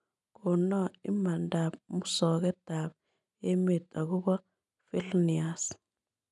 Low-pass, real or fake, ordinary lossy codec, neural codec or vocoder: 10.8 kHz; real; none; none